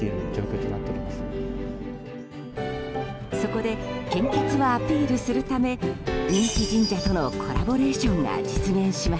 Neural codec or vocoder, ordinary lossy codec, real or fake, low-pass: none; none; real; none